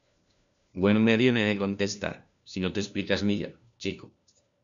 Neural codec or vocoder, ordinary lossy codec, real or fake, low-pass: codec, 16 kHz, 1 kbps, FunCodec, trained on LibriTTS, 50 frames a second; MP3, 96 kbps; fake; 7.2 kHz